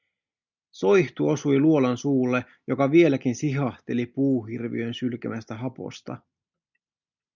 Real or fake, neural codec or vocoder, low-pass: real; none; 7.2 kHz